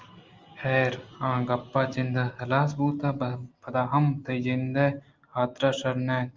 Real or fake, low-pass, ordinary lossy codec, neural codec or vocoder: real; 7.2 kHz; Opus, 32 kbps; none